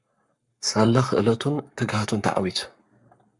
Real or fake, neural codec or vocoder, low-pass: fake; codec, 44.1 kHz, 7.8 kbps, Pupu-Codec; 10.8 kHz